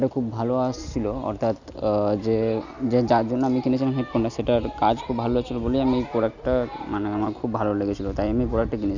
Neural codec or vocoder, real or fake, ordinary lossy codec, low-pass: none; real; none; 7.2 kHz